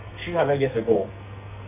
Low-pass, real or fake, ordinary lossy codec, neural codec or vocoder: 3.6 kHz; fake; none; codec, 44.1 kHz, 2.6 kbps, SNAC